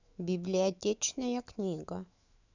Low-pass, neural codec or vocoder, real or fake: 7.2 kHz; autoencoder, 48 kHz, 128 numbers a frame, DAC-VAE, trained on Japanese speech; fake